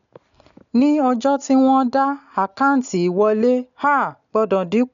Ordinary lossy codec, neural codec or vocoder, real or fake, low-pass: none; none; real; 7.2 kHz